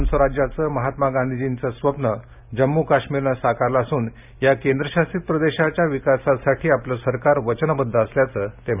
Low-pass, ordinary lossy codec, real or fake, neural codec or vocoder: 3.6 kHz; none; real; none